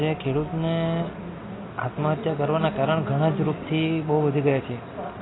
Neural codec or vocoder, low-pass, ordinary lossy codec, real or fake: none; 7.2 kHz; AAC, 16 kbps; real